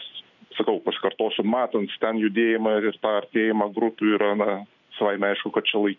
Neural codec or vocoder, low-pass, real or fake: none; 7.2 kHz; real